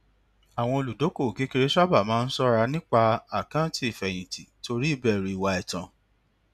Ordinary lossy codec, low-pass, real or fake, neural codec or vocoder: none; 14.4 kHz; real; none